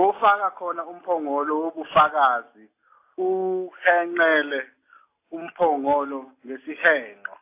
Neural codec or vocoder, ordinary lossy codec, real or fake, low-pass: none; AAC, 24 kbps; real; 3.6 kHz